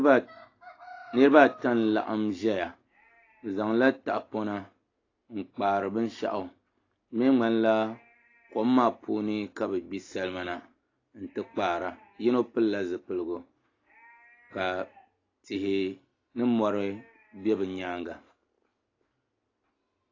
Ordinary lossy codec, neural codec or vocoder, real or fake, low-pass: AAC, 32 kbps; none; real; 7.2 kHz